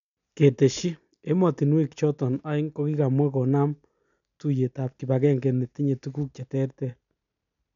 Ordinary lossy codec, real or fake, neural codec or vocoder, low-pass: none; real; none; 7.2 kHz